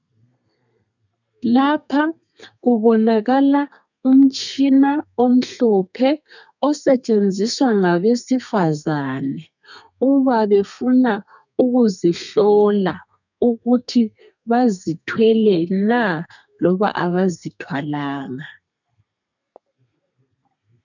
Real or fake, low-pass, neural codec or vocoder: fake; 7.2 kHz; codec, 32 kHz, 1.9 kbps, SNAC